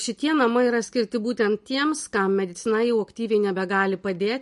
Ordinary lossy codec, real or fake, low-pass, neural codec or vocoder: MP3, 48 kbps; real; 14.4 kHz; none